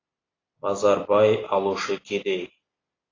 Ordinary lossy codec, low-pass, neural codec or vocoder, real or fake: AAC, 32 kbps; 7.2 kHz; none; real